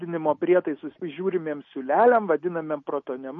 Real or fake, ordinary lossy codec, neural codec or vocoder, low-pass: real; MP3, 32 kbps; none; 7.2 kHz